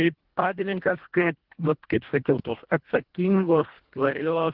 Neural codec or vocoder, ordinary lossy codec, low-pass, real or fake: codec, 24 kHz, 1.5 kbps, HILCodec; Opus, 16 kbps; 5.4 kHz; fake